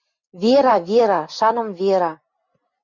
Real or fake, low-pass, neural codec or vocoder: real; 7.2 kHz; none